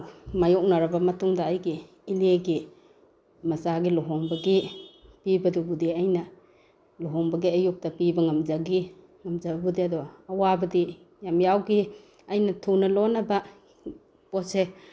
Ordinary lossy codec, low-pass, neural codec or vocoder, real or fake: none; none; none; real